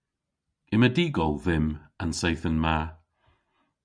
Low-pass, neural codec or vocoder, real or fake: 9.9 kHz; none; real